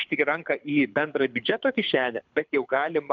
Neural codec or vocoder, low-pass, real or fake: codec, 24 kHz, 6 kbps, HILCodec; 7.2 kHz; fake